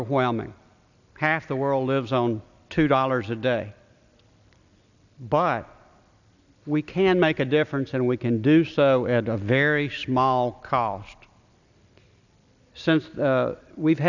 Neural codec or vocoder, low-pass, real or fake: none; 7.2 kHz; real